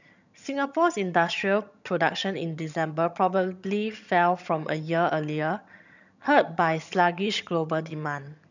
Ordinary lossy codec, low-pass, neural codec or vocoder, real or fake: none; 7.2 kHz; vocoder, 22.05 kHz, 80 mel bands, HiFi-GAN; fake